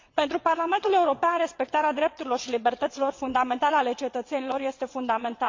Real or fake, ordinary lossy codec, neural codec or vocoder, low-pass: fake; MP3, 48 kbps; vocoder, 22.05 kHz, 80 mel bands, WaveNeXt; 7.2 kHz